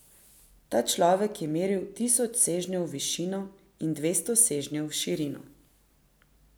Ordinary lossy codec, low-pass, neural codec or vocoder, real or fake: none; none; none; real